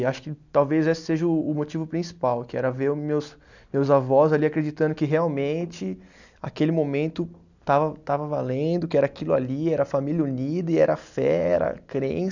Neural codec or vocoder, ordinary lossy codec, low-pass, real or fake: none; none; 7.2 kHz; real